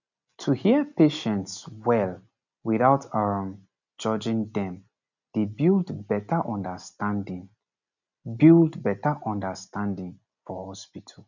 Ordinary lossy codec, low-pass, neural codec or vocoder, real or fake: none; 7.2 kHz; none; real